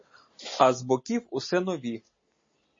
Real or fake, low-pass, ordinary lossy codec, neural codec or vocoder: real; 7.2 kHz; MP3, 32 kbps; none